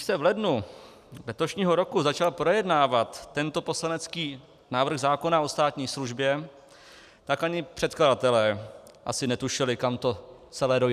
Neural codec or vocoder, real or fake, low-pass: none; real; 14.4 kHz